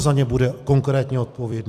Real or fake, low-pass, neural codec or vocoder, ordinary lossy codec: fake; 14.4 kHz; vocoder, 44.1 kHz, 128 mel bands every 256 samples, BigVGAN v2; AAC, 96 kbps